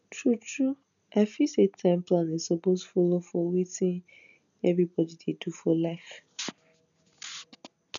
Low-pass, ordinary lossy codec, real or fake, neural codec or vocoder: 7.2 kHz; none; real; none